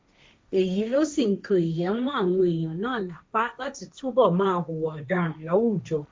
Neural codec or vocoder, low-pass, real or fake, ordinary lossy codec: codec, 16 kHz, 1.1 kbps, Voila-Tokenizer; none; fake; none